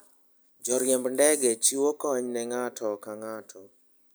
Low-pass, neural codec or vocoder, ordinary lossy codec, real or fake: none; none; none; real